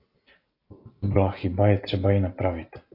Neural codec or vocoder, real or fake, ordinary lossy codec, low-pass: none; real; AAC, 32 kbps; 5.4 kHz